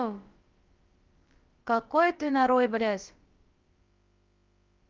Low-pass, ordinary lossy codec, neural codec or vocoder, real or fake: 7.2 kHz; Opus, 24 kbps; codec, 16 kHz, about 1 kbps, DyCAST, with the encoder's durations; fake